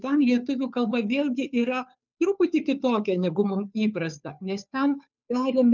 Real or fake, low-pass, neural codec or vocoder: fake; 7.2 kHz; codec, 16 kHz, 2 kbps, FunCodec, trained on Chinese and English, 25 frames a second